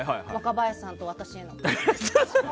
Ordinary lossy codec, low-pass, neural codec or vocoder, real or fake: none; none; none; real